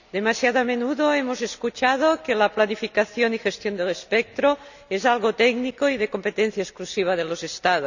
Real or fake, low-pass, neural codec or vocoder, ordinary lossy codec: real; 7.2 kHz; none; none